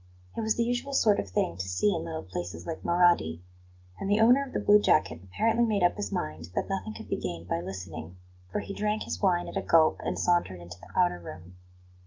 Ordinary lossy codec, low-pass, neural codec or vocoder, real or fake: Opus, 24 kbps; 7.2 kHz; none; real